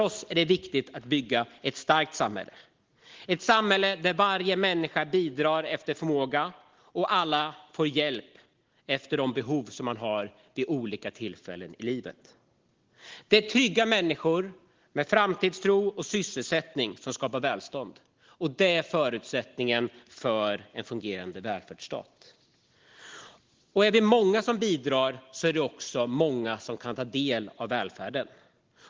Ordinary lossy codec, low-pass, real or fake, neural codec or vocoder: Opus, 16 kbps; 7.2 kHz; real; none